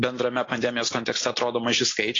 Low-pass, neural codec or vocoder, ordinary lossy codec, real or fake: 7.2 kHz; none; AAC, 32 kbps; real